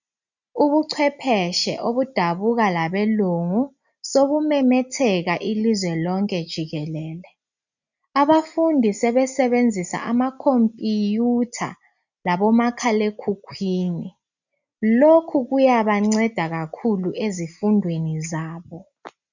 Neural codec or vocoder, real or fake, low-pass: none; real; 7.2 kHz